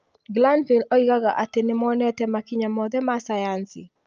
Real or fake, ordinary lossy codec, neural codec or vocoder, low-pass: real; Opus, 24 kbps; none; 7.2 kHz